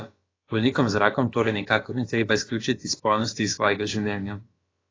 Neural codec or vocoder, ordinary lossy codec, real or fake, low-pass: codec, 16 kHz, about 1 kbps, DyCAST, with the encoder's durations; AAC, 32 kbps; fake; 7.2 kHz